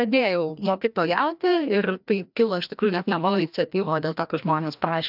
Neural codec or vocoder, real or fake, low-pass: codec, 16 kHz, 1 kbps, FreqCodec, larger model; fake; 5.4 kHz